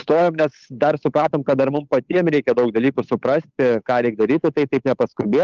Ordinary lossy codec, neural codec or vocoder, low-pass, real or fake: Opus, 24 kbps; codec, 16 kHz, 8 kbps, FunCodec, trained on Chinese and English, 25 frames a second; 7.2 kHz; fake